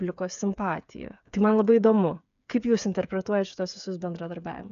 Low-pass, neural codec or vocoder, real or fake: 7.2 kHz; codec, 16 kHz, 8 kbps, FreqCodec, smaller model; fake